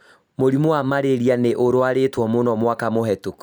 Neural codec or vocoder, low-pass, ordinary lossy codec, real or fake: none; none; none; real